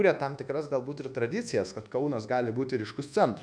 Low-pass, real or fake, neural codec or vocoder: 9.9 kHz; fake; codec, 24 kHz, 1.2 kbps, DualCodec